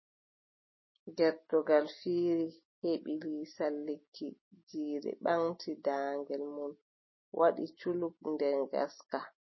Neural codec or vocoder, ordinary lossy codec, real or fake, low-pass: none; MP3, 24 kbps; real; 7.2 kHz